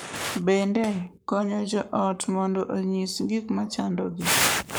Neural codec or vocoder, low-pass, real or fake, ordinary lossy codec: codec, 44.1 kHz, 7.8 kbps, Pupu-Codec; none; fake; none